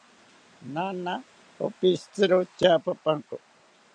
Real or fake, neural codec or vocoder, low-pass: real; none; 9.9 kHz